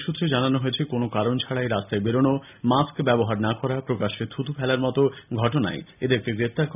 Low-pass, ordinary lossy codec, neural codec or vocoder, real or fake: 3.6 kHz; none; none; real